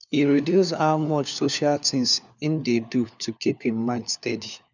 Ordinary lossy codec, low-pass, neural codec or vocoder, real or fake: none; 7.2 kHz; codec, 16 kHz, 4 kbps, FunCodec, trained on LibriTTS, 50 frames a second; fake